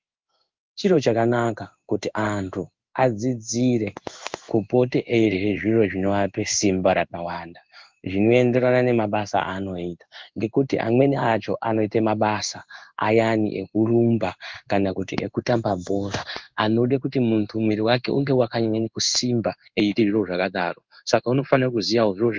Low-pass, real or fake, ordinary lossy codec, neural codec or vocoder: 7.2 kHz; fake; Opus, 32 kbps; codec, 16 kHz in and 24 kHz out, 1 kbps, XY-Tokenizer